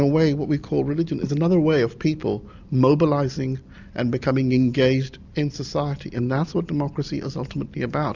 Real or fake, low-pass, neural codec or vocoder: real; 7.2 kHz; none